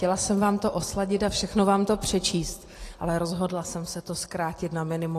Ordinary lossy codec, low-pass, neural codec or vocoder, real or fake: AAC, 48 kbps; 14.4 kHz; none; real